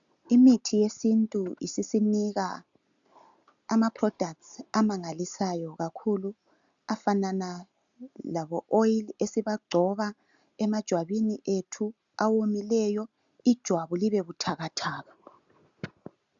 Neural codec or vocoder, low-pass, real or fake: none; 7.2 kHz; real